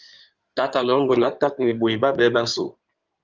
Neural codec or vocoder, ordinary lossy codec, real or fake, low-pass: codec, 16 kHz in and 24 kHz out, 2.2 kbps, FireRedTTS-2 codec; Opus, 32 kbps; fake; 7.2 kHz